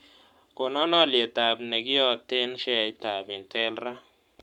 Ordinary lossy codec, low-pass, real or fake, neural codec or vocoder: none; 19.8 kHz; fake; codec, 44.1 kHz, 7.8 kbps, Pupu-Codec